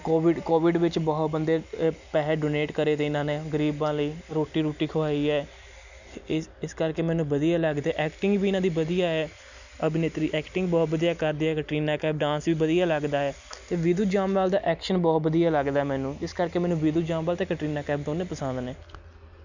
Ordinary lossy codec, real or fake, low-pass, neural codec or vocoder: none; real; 7.2 kHz; none